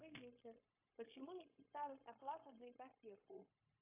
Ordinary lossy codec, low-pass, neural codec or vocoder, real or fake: AAC, 24 kbps; 3.6 kHz; codec, 16 kHz, 0.9 kbps, LongCat-Audio-Codec; fake